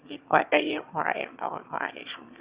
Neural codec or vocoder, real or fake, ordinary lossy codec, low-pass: autoencoder, 22.05 kHz, a latent of 192 numbers a frame, VITS, trained on one speaker; fake; Opus, 64 kbps; 3.6 kHz